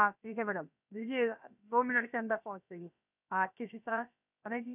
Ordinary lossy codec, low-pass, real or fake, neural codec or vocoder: none; 3.6 kHz; fake; codec, 16 kHz, about 1 kbps, DyCAST, with the encoder's durations